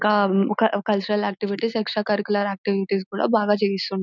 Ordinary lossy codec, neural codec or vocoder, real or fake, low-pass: none; none; real; 7.2 kHz